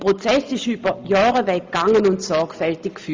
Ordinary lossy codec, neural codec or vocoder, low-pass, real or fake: Opus, 32 kbps; none; 7.2 kHz; real